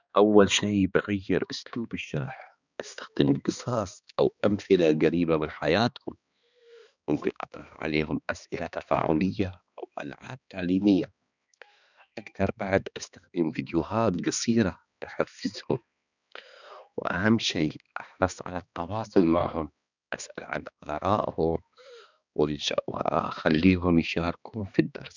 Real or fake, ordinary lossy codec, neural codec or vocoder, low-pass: fake; none; codec, 16 kHz, 2 kbps, X-Codec, HuBERT features, trained on balanced general audio; 7.2 kHz